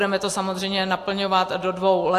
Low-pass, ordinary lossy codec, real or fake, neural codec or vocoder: 14.4 kHz; AAC, 64 kbps; real; none